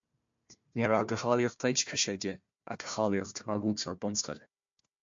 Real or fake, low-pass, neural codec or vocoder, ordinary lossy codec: fake; 7.2 kHz; codec, 16 kHz, 1 kbps, FunCodec, trained on Chinese and English, 50 frames a second; MP3, 48 kbps